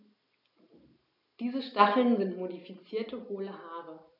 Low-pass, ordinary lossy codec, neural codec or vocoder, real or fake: 5.4 kHz; none; none; real